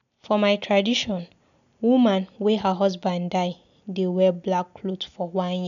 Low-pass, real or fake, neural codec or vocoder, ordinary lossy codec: 7.2 kHz; real; none; none